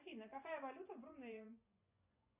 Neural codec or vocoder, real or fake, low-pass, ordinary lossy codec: vocoder, 24 kHz, 100 mel bands, Vocos; fake; 3.6 kHz; AAC, 32 kbps